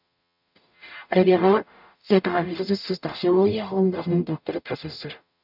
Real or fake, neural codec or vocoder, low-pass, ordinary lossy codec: fake; codec, 44.1 kHz, 0.9 kbps, DAC; 5.4 kHz; none